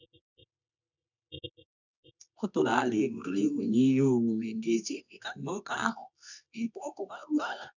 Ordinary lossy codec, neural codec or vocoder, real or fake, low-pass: none; codec, 24 kHz, 0.9 kbps, WavTokenizer, medium music audio release; fake; 7.2 kHz